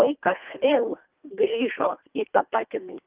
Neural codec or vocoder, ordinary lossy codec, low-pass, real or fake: codec, 24 kHz, 1.5 kbps, HILCodec; Opus, 24 kbps; 3.6 kHz; fake